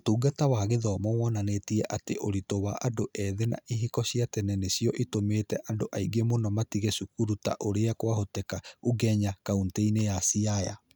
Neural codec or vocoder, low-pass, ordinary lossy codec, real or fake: none; none; none; real